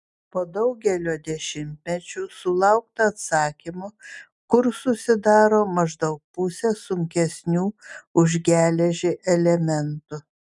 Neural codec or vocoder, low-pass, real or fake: none; 10.8 kHz; real